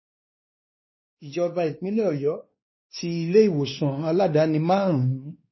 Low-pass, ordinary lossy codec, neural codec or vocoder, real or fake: 7.2 kHz; MP3, 24 kbps; codec, 16 kHz, 2 kbps, X-Codec, WavLM features, trained on Multilingual LibriSpeech; fake